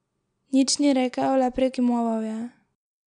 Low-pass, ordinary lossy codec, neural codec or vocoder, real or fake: 9.9 kHz; none; none; real